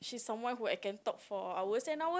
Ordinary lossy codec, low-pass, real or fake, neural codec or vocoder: none; none; real; none